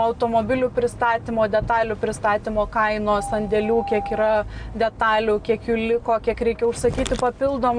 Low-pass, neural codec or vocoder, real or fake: 9.9 kHz; none; real